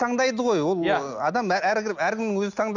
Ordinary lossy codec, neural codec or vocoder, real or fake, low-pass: none; none; real; 7.2 kHz